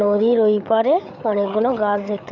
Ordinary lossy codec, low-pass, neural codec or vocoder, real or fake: none; 7.2 kHz; codec, 16 kHz, 8 kbps, FreqCodec, larger model; fake